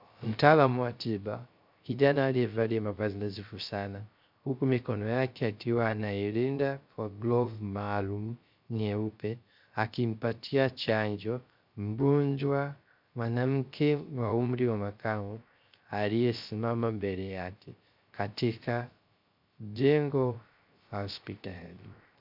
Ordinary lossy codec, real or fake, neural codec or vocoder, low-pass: MP3, 48 kbps; fake; codec, 16 kHz, 0.3 kbps, FocalCodec; 5.4 kHz